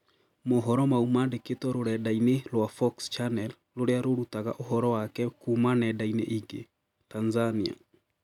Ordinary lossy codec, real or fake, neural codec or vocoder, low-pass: none; real; none; 19.8 kHz